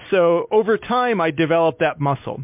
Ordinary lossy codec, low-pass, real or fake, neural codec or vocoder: MP3, 32 kbps; 3.6 kHz; real; none